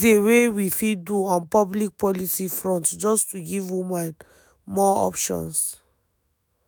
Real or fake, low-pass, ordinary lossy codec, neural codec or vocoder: fake; none; none; autoencoder, 48 kHz, 128 numbers a frame, DAC-VAE, trained on Japanese speech